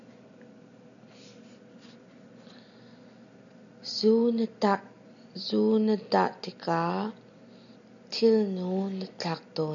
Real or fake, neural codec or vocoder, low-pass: real; none; 7.2 kHz